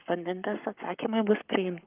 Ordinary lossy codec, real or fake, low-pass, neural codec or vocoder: Opus, 24 kbps; fake; 3.6 kHz; codec, 44.1 kHz, 7.8 kbps, Pupu-Codec